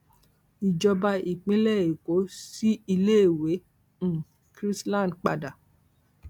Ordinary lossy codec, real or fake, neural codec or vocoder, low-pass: none; real; none; 19.8 kHz